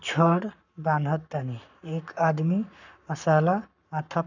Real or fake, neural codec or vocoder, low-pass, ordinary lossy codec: fake; codec, 44.1 kHz, 7.8 kbps, Pupu-Codec; 7.2 kHz; none